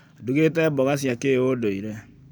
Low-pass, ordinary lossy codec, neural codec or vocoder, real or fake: none; none; none; real